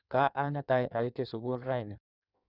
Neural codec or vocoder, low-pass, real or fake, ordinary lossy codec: codec, 16 kHz in and 24 kHz out, 1.1 kbps, FireRedTTS-2 codec; 5.4 kHz; fake; none